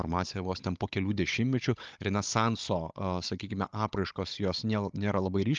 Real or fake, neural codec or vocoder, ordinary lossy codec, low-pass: fake; codec, 16 kHz, 16 kbps, FunCodec, trained on Chinese and English, 50 frames a second; Opus, 24 kbps; 7.2 kHz